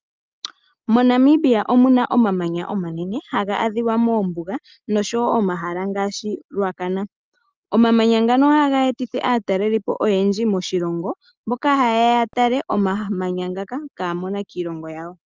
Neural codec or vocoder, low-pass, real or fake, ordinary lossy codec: none; 7.2 kHz; real; Opus, 24 kbps